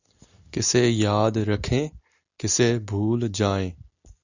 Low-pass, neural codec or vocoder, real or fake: 7.2 kHz; none; real